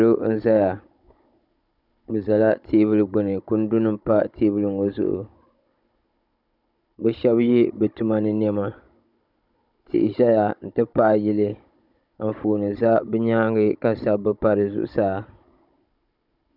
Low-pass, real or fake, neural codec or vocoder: 5.4 kHz; fake; codec, 16 kHz, 16 kbps, FunCodec, trained on Chinese and English, 50 frames a second